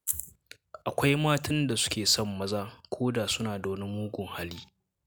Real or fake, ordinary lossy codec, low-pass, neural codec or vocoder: real; none; none; none